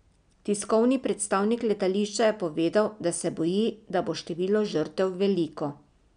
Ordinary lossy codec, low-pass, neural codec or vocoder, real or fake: none; 9.9 kHz; none; real